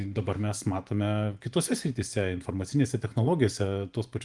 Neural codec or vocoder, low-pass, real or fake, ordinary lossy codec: vocoder, 24 kHz, 100 mel bands, Vocos; 10.8 kHz; fake; Opus, 24 kbps